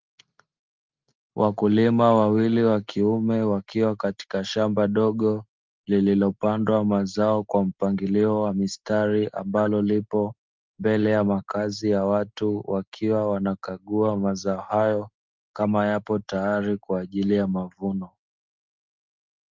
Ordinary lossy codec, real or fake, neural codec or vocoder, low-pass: Opus, 24 kbps; real; none; 7.2 kHz